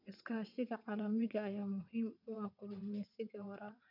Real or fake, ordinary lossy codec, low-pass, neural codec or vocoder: fake; none; 5.4 kHz; vocoder, 22.05 kHz, 80 mel bands, WaveNeXt